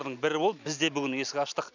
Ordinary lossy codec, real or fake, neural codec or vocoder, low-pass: none; real; none; 7.2 kHz